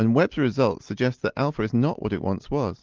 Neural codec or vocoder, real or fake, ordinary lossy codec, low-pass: none; real; Opus, 32 kbps; 7.2 kHz